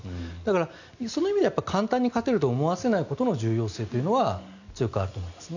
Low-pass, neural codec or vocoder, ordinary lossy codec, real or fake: 7.2 kHz; none; none; real